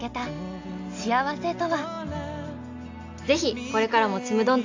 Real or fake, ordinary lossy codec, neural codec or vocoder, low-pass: real; AAC, 48 kbps; none; 7.2 kHz